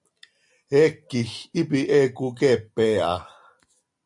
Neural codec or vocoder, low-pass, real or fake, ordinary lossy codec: none; 10.8 kHz; real; MP3, 64 kbps